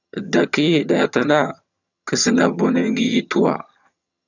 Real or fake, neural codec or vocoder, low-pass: fake; vocoder, 22.05 kHz, 80 mel bands, HiFi-GAN; 7.2 kHz